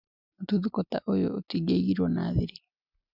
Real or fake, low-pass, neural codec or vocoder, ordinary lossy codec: real; 5.4 kHz; none; none